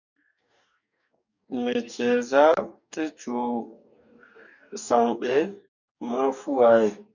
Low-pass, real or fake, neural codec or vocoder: 7.2 kHz; fake; codec, 44.1 kHz, 2.6 kbps, DAC